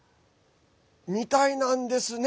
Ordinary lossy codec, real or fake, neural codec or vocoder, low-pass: none; real; none; none